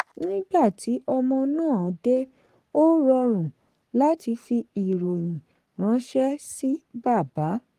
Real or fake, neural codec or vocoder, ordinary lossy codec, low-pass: fake; codec, 44.1 kHz, 3.4 kbps, Pupu-Codec; Opus, 24 kbps; 14.4 kHz